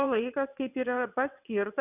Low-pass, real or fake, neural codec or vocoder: 3.6 kHz; fake; vocoder, 22.05 kHz, 80 mel bands, WaveNeXt